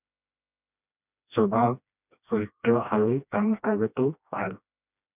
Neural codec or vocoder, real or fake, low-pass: codec, 16 kHz, 1 kbps, FreqCodec, smaller model; fake; 3.6 kHz